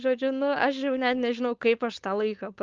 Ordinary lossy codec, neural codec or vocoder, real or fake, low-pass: Opus, 24 kbps; codec, 16 kHz, 4.8 kbps, FACodec; fake; 7.2 kHz